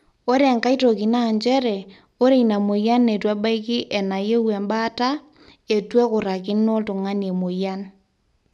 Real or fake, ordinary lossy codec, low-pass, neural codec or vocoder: real; none; none; none